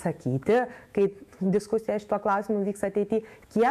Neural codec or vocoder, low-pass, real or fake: autoencoder, 48 kHz, 128 numbers a frame, DAC-VAE, trained on Japanese speech; 14.4 kHz; fake